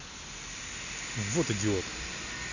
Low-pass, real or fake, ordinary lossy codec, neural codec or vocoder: 7.2 kHz; real; none; none